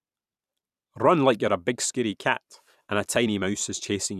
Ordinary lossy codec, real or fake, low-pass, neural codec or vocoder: none; fake; 14.4 kHz; vocoder, 44.1 kHz, 128 mel bands every 256 samples, BigVGAN v2